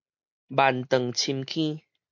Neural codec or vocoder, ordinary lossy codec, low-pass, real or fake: none; MP3, 64 kbps; 7.2 kHz; real